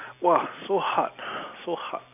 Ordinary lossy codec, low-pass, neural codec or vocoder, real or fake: none; 3.6 kHz; none; real